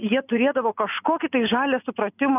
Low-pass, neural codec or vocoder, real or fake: 3.6 kHz; none; real